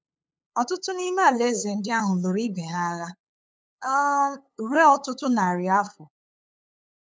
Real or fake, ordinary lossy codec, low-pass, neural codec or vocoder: fake; none; none; codec, 16 kHz, 8 kbps, FunCodec, trained on LibriTTS, 25 frames a second